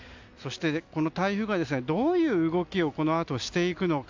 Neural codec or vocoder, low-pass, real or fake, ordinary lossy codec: none; 7.2 kHz; real; MP3, 64 kbps